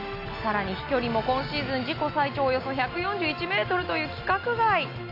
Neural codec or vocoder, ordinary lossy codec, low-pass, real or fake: none; none; 5.4 kHz; real